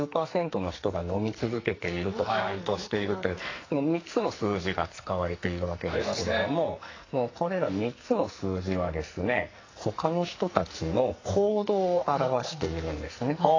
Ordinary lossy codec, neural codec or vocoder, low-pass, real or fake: AAC, 32 kbps; codec, 44.1 kHz, 2.6 kbps, SNAC; 7.2 kHz; fake